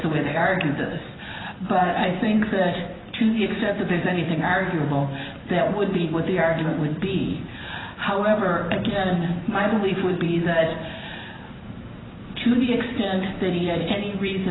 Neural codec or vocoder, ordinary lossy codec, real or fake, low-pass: vocoder, 44.1 kHz, 128 mel bands every 512 samples, BigVGAN v2; AAC, 16 kbps; fake; 7.2 kHz